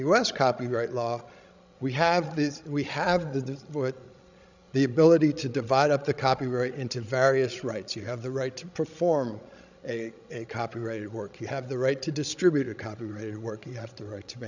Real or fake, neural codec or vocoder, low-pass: fake; codec, 16 kHz, 16 kbps, FreqCodec, larger model; 7.2 kHz